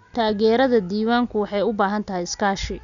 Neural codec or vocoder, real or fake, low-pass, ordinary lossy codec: none; real; 7.2 kHz; none